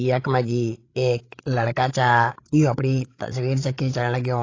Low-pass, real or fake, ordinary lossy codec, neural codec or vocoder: 7.2 kHz; fake; AAC, 32 kbps; codec, 16 kHz, 16 kbps, FreqCodec, larger model